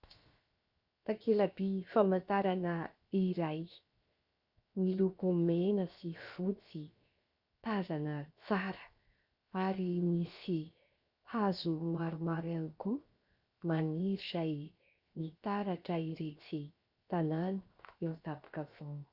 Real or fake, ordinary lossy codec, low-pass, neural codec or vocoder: fake; Opus, 64 kbps; 5.4 kHz; codec, 16 kHz, 0.7 kbps, FocalCodec